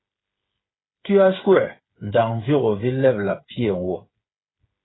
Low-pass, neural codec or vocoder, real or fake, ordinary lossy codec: 7.2 kHz; codec, 16 kHz, 8 kbps, FreqCodec, smaller model; fake; AAC, 16 kbps